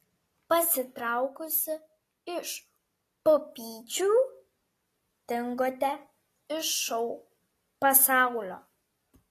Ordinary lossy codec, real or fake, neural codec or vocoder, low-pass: AAC, 48 kbps; real; none; 14.4 kHz